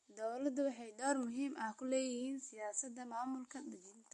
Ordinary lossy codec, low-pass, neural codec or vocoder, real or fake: MP3, 48 kbps; 14.4 kHz; none; real